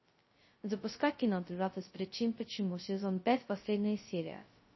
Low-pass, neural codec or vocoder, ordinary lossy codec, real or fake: 7.2 kHz; codec, 16 kHz, 0.2 kbps, FocalCodec; MP3, 24 kbps; fake